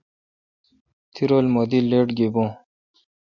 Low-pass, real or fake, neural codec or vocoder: 7.2 kHz; real; none